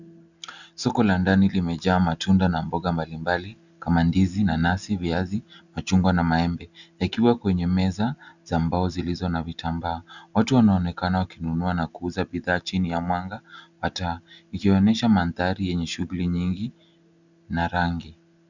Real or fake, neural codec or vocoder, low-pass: real; none; 7.2 kHz